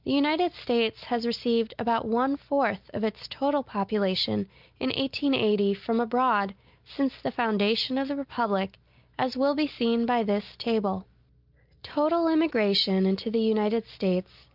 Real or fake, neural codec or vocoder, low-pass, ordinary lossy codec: real; none; 5.4 kHz; Opus, 24 kbps